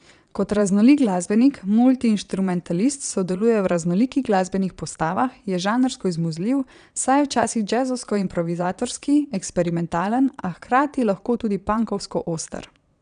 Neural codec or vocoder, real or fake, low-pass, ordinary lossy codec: vocoder, 22.05 kHz, 80 mel bands, Vocos; fake; 9.9 kHz; none